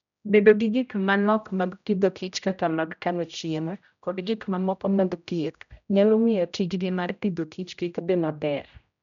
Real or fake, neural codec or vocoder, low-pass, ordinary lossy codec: fake; codec, 16 kHz, 0.5 kbps, X-Codec, HuBERT features, trained on general audio; 7.2 kHz; none